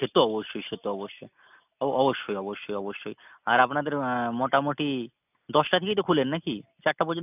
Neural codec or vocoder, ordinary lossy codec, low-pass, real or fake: none; none; 3.6 kHz; real